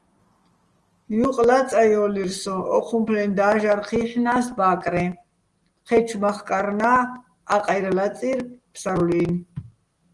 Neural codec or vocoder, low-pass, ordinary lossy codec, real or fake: none; 10.8 kHz; Opus, 24 kbps; real